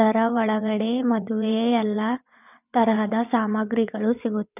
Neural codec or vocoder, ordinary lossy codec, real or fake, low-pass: vocoder, 22.05 kHz, 80 mel bands, WaveNeXt; none; fake; 3.6 kHz